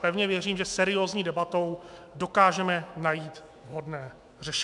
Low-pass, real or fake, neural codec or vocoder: 10.8 kHz; fake; autoencoder, 48 kHz, 128 numbers a frame, DAC-VAE, trained on Japanese speech